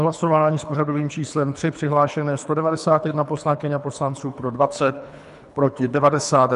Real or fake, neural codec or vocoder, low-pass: fake; codec, 24 kHz, 3 kbps, HILCodec; 10.8 kHz